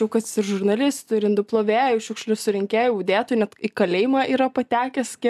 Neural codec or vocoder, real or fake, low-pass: none; real; 14.4 kHz